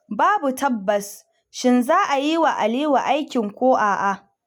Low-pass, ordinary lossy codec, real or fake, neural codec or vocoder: 19.8 kHz; none; real; none